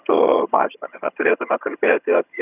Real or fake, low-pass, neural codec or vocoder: fake; 3.6 kHz; vocoder, 22.05 kHz, 80 mel bands, HiFi-GAN